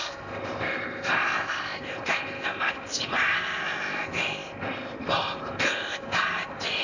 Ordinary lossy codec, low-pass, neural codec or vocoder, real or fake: none; 7.2 kHz; codec, 16 kHz in and 24 kHz out, 0.8 kbps, FocalCodec, streaming, 65536 codes; fake